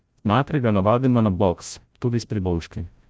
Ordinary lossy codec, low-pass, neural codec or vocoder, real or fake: none; none; codec, 16 kHz, 0.5 kbps, FreqCodec, larger model; fake